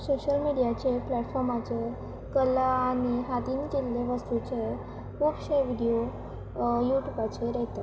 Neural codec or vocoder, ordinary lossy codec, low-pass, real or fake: none; none; none; real